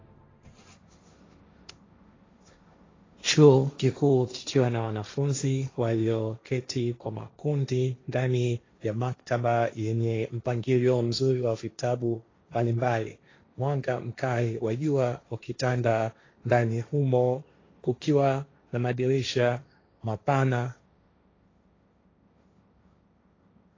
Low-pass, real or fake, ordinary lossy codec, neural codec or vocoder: 7.2 kHz; fake; AAC, 32 kbps; codec, 16 kHz, 1.1 kbps, Voila-Tokenizer